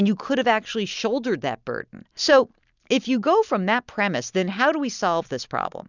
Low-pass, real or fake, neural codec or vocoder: 7.2 kHz; real; none